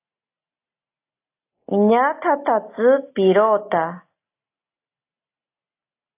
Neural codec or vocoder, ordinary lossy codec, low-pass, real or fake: none; AAC, 24 kbps; 3.6 kHz; real